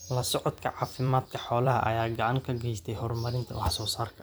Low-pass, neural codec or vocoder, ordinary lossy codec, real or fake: none; vocoder, 44.1 kHz, 128 mel bands every 256 samples, BigVGAN v2; none; fake